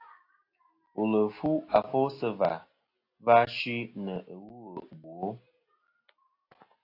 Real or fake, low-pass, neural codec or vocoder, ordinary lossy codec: real; 5.4 kHz; none; AAC, 32 kbps